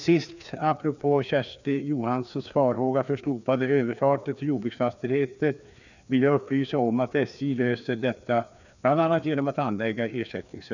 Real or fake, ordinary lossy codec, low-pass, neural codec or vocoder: fake; none; 7.2 kHz; codec, 16 kHz, 2 kbps, FreqCodec, larger model